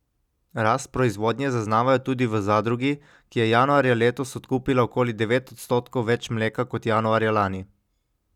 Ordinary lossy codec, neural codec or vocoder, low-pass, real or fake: none; none; 19.8 kHz; real